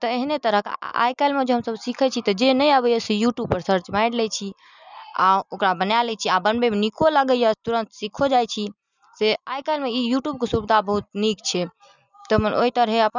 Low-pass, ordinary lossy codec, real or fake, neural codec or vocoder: 7.2 kHz; none; real; none